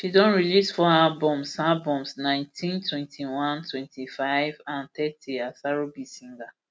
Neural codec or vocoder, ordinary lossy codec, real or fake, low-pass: none; none; real; none